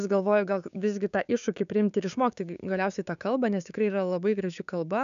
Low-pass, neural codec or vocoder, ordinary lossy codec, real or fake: 7.2 kHz; codec, 16 kHz, 6 kbps, DAC; MP3, 96 kbps; fake